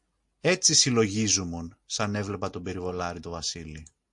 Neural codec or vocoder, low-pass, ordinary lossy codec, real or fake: none; 10.8 kHz; MP3, 48 kbps; real